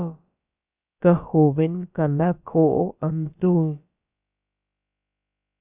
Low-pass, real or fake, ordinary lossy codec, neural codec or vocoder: 3.6 kHz; fake; Opus, 64 kbps; codec, 16 kHz, about 1 kbps, DyCAST, with the encoder's durations